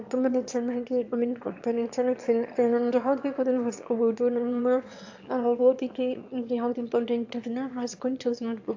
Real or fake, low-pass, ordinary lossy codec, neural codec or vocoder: fake; 7.2 kHz; AAC, 48 kbps; autoencoder, 22.05 kHz, a latent of 192 numbers a frame, VITS, trained on one speaker